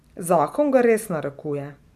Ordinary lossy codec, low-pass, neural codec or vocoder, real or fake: none; 14.4 kHz; none; real